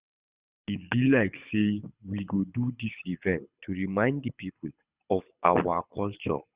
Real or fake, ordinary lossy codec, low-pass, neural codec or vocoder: fake; Opus, 64 kbps; 3.6 kHz; codec, 24 kHz, 6 kbps, HILCodec